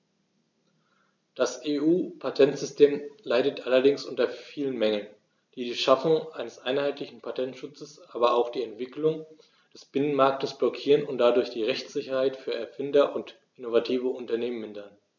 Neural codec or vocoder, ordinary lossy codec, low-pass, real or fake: none; none; 7.2 kHz; real